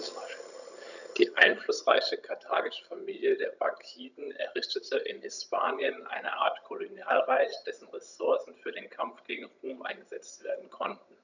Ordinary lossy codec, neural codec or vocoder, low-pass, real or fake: none; vocoder, 22.05 kHz, 80 mel bands, HiFi-GAN; 7.2 kHz; fake